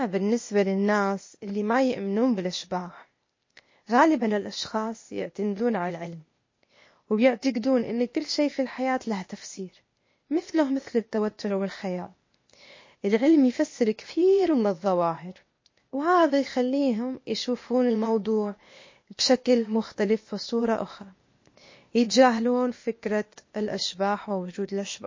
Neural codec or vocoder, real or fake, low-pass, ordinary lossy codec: codec, 16 kHz, 0.8 kbps, ZipCodec; fake; 7.2 kHz; MP3, 32 kbps